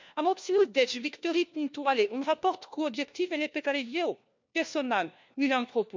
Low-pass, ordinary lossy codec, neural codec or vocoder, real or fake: 7.2 kHz; MP3, 64 kbps; codec, 16 kHz, 1 kbps, FunCodec, trained on LibriTTS, 50 frames a second; fake